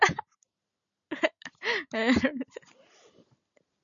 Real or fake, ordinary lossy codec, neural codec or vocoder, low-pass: real; MP3, 96 kbps; none; 7.2 kHz